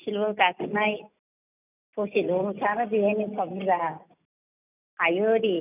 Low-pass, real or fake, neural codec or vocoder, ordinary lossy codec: 3.6 kHz; real; none; none